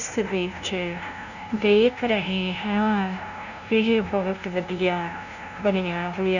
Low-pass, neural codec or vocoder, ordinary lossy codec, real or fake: 7.2 kHz; codec, 16 kHz, 0.5 kbps, FunCodec, trained on LibriTTS, 25 frames a second; none; fake